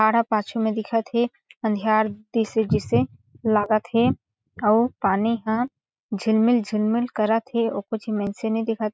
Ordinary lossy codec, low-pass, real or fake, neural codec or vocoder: none; none; real; none